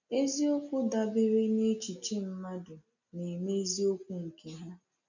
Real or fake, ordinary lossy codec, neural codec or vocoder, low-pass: real; none; none; 7.2 kHz